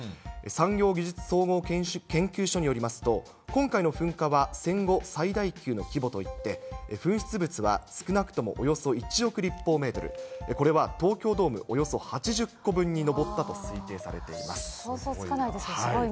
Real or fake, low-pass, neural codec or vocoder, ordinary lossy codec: real; none; none; none